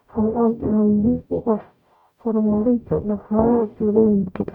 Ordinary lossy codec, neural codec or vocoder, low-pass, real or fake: none; codec, 44.1 kHz, 0.9 kbps, DAC; 19.8 kHz; fake